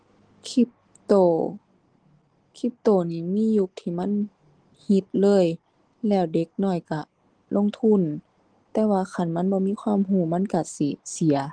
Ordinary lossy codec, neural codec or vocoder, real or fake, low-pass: Opus, 16 kbps; none; real; 9.9 kHz